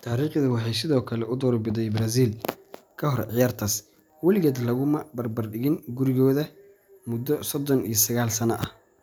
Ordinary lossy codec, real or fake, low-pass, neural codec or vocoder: none; real; none; none